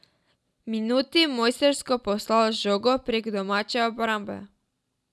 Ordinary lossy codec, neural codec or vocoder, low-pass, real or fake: none; none; none; real